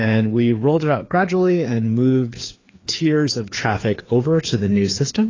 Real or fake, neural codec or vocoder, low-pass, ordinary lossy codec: fake; codec, 16 kHz, 2 kbps, FreqCodec, larger model; 7.2 kHz; AAC, 32 kbps